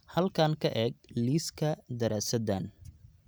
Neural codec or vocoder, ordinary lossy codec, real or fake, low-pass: none; none; real; none